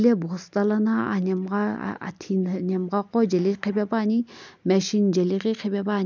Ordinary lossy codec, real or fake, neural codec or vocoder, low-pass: none; real; none; 7.2 kHz